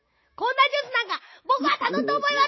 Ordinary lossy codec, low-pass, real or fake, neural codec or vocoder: MP3, 24 kbps; 7.2 kHz; fake; autoencoder, 48 kHz, 128 numbers a frame, DAC-VAE, trained on Japanese speech